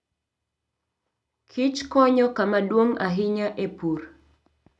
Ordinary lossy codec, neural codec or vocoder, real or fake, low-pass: none; none; real; none